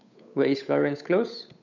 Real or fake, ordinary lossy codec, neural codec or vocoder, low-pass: fake; none; codec, 16 kHz, 8 kbps, FunCodec, trained on Chinese and English, 25 frames a second; 7.2 kHz